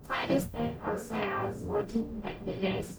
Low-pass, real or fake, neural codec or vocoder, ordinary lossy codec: none; fake; codec, 44.1 kHz, 0.9 kbps, DAC; none